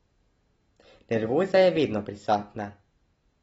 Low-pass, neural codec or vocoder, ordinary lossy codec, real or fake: 19.8 kHz; none; AAC, 24 kbps; real